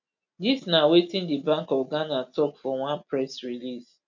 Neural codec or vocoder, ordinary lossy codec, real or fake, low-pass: none; none; real; 7.2 kHz